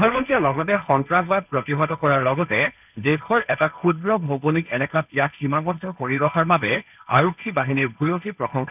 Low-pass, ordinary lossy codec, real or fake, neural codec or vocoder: 3.6 kHz; none; fake; codec, 16 kHz, 1.1 kbps, Voila-Tokenizer